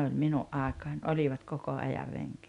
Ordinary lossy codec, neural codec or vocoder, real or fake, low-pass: none; none; real; 10.8 kHz